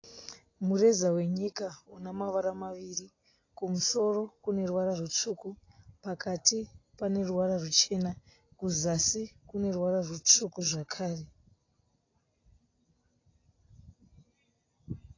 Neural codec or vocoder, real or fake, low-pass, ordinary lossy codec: none; real; 7.2 kHz; AAC, 32 kbps